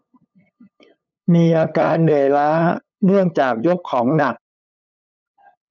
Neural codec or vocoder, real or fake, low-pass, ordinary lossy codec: codec, 16 kHz, 8 kbps, FunCodec, trained on LibriTTS, 25 frames a second; fake; 7.2 kHz; none